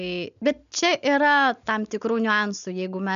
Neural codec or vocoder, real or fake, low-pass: none; real; 7.2 kHz